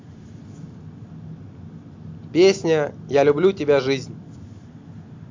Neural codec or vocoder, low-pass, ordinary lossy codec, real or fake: none; 7.2 kHz; MP3, 48 kbps; real